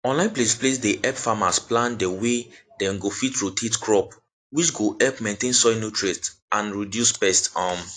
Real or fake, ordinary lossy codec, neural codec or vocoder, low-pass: real; AAC, 48 kbps; none; 9.9 kHz